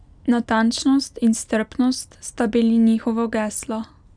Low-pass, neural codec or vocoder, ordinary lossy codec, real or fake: 9.9 kHz; none; none; real